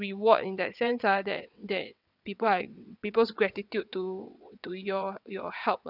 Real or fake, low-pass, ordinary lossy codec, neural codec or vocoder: fake; 5.4 kHz; none; vocoder, 22.05 kHz, 80 mel bands, WaveNeXt